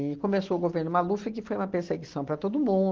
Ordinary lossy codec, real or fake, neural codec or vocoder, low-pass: Opus, 16 kbps; real; none; 7.2 kHz